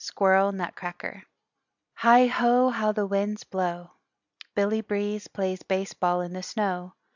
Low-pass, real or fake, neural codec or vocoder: 7.2 kHz; real; none